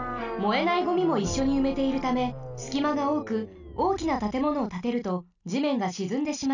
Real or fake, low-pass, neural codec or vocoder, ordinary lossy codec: real; 7.2 kHz; none; none